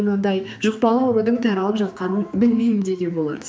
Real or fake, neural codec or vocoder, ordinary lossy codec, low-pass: fake; codec, 16 kHz, 2 kbps, X-Codec, HuBERT features, trained on general audio; none; none